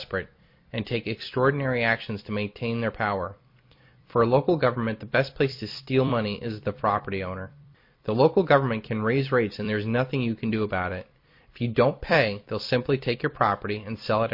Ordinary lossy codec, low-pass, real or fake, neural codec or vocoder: MP3, 32 kbps; 5.4 kHz; fake; vocoder, 44.1 kHz, 128 mel bands every 256 samples, BigVGAN v2